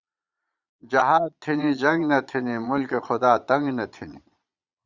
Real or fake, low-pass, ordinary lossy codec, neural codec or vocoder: fake; 7.2 kHz; Opus, 64 kbps; vocoder, 22.05 kHz, 80 mel bands, Vocos